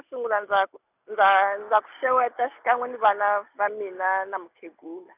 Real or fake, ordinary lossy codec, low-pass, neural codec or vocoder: real; none; 3.6 kHz; none